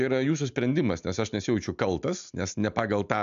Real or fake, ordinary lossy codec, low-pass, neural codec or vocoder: real; AAC, 96 kbps; 7.2 kHz; none